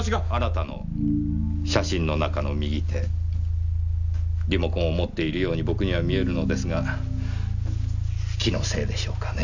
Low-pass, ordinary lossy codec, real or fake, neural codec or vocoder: 7.2 kHz; none; real; none